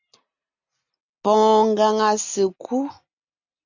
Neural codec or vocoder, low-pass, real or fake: none; 7.2 kHz; real